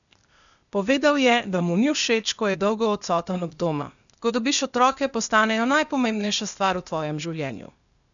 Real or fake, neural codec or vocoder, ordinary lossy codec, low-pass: fake; codec, 16 kHz, 0.8 kbps, ZipCodec; none; 7.2 kHz